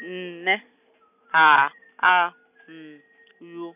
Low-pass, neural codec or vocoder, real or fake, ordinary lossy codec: 3.6 kHz; none; real; none